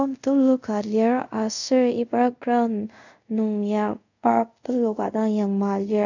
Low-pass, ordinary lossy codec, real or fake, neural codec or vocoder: 7.2 kHz; none; fake; codec, 24 kHz, 0.5 kbps, DualCodec